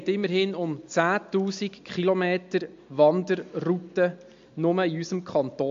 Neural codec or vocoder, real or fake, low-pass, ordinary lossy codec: none; real; 7.2 kHz; MP3, 48 kbps